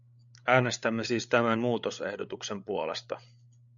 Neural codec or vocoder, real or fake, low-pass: codec, 16 kHz, 8 kbps, FreqCodec, larger model; fake; 7.2 kHz